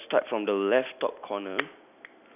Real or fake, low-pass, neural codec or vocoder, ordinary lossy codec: real; 3.6 kHz; none; none